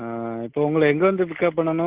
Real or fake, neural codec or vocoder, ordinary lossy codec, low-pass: real; none; Opus, 16 kbps; 3.6 kHz